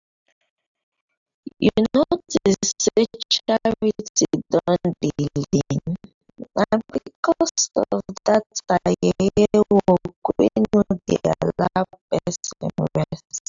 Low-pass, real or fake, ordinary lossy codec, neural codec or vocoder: 7.2 kHz; real; AAC, 64 kbps; none